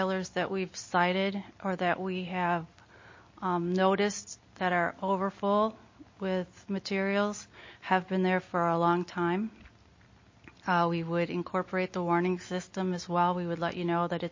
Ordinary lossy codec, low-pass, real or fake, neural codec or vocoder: MP3, 32 kbps; 7.2 kHz; real; none